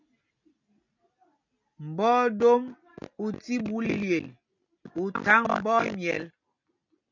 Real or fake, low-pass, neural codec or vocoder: real; 7.2 kHz; none